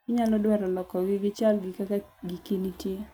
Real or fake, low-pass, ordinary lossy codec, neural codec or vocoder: real; none; none; none